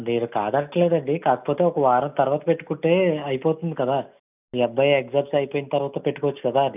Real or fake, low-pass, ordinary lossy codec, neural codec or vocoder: real; 3.6 kHz; none; none